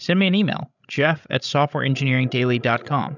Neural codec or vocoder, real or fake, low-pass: codec, 16 kHz, 16 kbps, FreqCodec, larger model; fake; 7.2 kHz